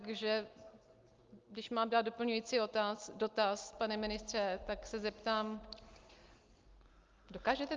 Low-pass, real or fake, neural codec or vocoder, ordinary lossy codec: 7.2 kHz; real; none; Opus, 32 kbps